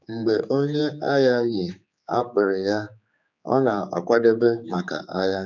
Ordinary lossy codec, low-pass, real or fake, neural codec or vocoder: none; 7.2 kHz; fake; codec, 16 kHz, 4 kbps, X-Codec, HuBERT features, trained on general audio